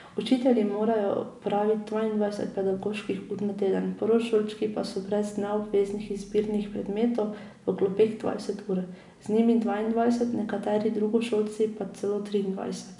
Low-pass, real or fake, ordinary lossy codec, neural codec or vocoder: 10.8 kHz; real; none; none